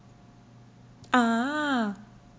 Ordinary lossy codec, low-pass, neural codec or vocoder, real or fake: none; none; none; real